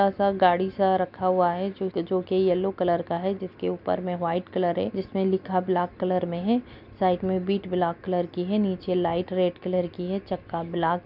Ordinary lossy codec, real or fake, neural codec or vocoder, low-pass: none; real; none; 5.4 kHz